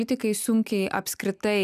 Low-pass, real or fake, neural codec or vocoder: 14.4 kHz; real; none